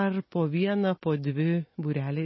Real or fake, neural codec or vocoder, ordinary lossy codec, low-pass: real; none; MP3, 24 kbps; 7.2 kHz